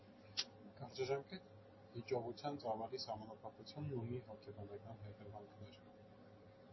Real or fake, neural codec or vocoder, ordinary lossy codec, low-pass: real; none; MP3, 24 kbps; 7.2 kHz